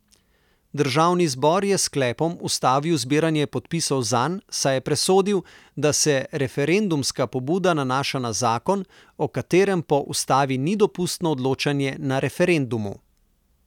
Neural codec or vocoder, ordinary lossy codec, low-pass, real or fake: none; none; 19.8 kHz; real